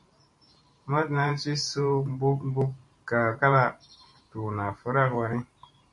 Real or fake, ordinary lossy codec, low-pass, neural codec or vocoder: fake; MP3, 48 kbps; 10.8 kHz; vocoder, 44.1 kHz, 128 mel bands every 512 samples, BigVGAN v2